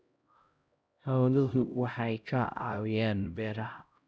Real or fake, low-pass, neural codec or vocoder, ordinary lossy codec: fake; none; codec, 16 kHz, 0.5 kbps, X-Codec, HuBERT features, trained on LibriSpeech; none